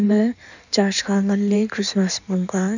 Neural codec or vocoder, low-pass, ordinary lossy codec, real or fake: codec, 16 kHz in and 24 kHz out, 1.1 kbps, FireRedTTS-2 codec; 7.2 kHz; none; fake